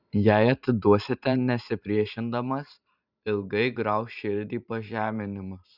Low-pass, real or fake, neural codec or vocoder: 5.4 kHz; fake; vocoder, 44.1 kHz, 128 mel bands every 512 samples, BigVGAN v2